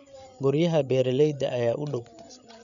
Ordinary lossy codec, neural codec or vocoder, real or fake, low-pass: none; codec, 16 kHz, 8 kbps, FreqCodec, larger model; fake; 7.2 kHz